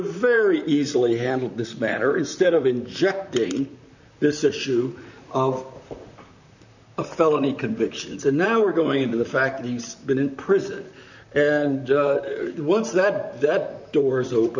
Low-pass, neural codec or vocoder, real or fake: 7.2 kHz; vocoder, 44.1 kHz, 128 mel bands, Pupu-Vocoder; fake